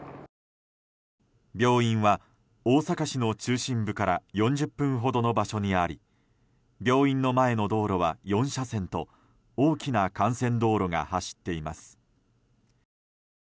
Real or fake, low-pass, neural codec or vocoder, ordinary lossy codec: real; none; none; none